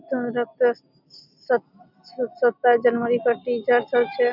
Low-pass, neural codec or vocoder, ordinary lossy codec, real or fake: 5.4 kHz; none; none; real